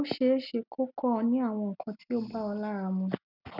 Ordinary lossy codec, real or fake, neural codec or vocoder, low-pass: none; real; none; 5.4 kHz